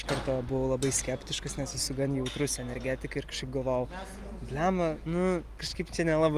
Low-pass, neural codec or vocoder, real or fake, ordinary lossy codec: 14.4 kHz; none; real; Opus, 32 kbps